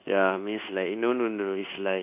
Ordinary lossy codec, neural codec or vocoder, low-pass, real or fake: AAC, 32 kbps; codec, 24 kHz, 1.2 kbps, DualCodec; 3.6 kHz; fake